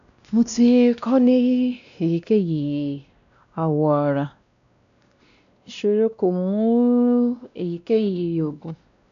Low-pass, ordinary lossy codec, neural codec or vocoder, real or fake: 7.2 kHz; AAC, 96 kbps; codec, 16 kHz, 1 kbps, X-Codec, WavLM features, trained on Multilingual LibriSpeech; fake